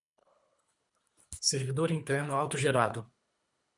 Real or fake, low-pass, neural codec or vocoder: fake; 10.8 kHz; codec, 24 kHz, 3 kbps, HILCodec